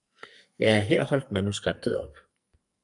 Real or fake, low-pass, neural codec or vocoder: fake; 10.8 kHz; codec, 44.1 kHz, 2.6 kbps, SNAC